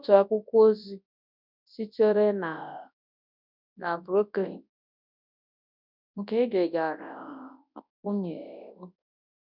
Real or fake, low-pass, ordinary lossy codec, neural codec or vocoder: fake; 5.4 kHz; none; codec, 24 kHz, 0.9 kbps, WavTokenizer, large speech release